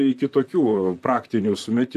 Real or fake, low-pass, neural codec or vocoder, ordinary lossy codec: fake; 14.4 kHz; vocoder, 44.1 kHz, 128 mel bands, Pupu-Vocoder; AAC, 96 kbps